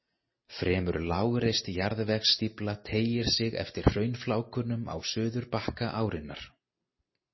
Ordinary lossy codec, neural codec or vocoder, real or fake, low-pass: MP3, 24 kbps; none; real; 7.2 kHz